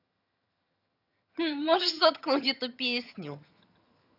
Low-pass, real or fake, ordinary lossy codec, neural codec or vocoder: 5.4 kHz; fake; none; vocoder, 22.05 kHz, 80 mel bands, HiFi-GAN